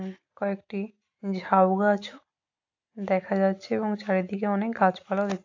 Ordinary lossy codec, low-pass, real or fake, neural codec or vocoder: AAC, 48 kbps; 7.2 kHz; real; none